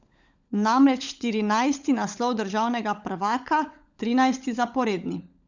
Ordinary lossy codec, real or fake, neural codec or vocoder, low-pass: Opus, 64 kbps; fake; codec, 16 kHz, 16 kbps, FunCodec, trained on LibriTTS, 50 frames a second; 7.2 kHz